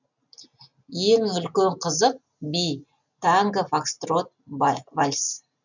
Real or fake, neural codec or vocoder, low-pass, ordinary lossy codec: real; none; 7.2 kHz; none